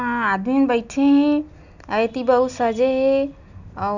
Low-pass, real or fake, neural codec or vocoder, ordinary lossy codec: 7.2 kHz; real; none; none